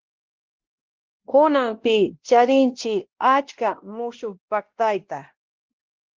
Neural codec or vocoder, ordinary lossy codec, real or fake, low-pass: codec, 16 kHz, 1 kbps, X-Codec, WavLM features, trained on Multilingual LibriSpeech; Opus, 16 kbps; fake; 7.2 kHz